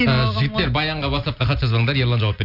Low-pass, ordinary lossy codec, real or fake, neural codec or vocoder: 5.4 kHz; none; real; none